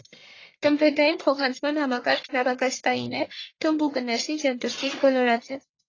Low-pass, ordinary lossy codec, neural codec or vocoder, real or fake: 7.2 kHz; AAC, 32 kbps; codec, 44.1 kHz, 1.7 kbps, Pupu-Codec; fake